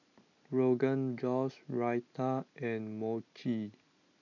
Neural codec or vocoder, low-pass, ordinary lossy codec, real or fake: none; 7.2 kHz; none; real